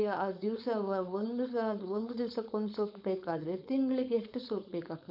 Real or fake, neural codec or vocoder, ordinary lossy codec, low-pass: fake; codec, 16 kHz, 4.8 kbps, FACodec; none; 5.4 kHz